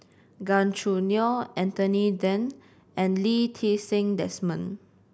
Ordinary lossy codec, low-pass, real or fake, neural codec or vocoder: none; none; real; none